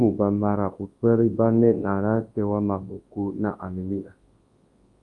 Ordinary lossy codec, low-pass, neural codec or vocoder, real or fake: Opus, 32 kbps; 10.8 kHz; codec, 24 kHz, 0.9 kbps, WavTokenizer, large speech release; fake